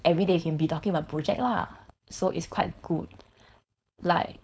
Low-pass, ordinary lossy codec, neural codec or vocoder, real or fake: none; none; codec, 16 kHz, 4.8 kbps, FACodec; fake